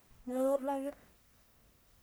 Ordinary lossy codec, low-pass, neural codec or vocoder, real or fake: none; none; codec, 44.1 kHz, 1.7 kbps, Pupu-Codec; fake